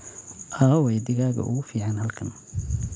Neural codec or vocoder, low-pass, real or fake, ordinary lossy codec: none; none; real; none